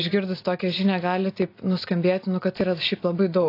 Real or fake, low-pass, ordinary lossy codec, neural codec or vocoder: real; 5.4 kHz; AAC, 32 kbps; none